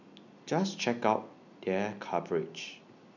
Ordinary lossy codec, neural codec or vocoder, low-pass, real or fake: none; none; 7.2 kHz; real